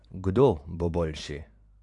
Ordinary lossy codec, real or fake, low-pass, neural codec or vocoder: AAC, 48 kbps; fake; 10.8 kHz; vocoder, 44.1 kHz, 128 mel bands every 512 samples, BigVGAN v2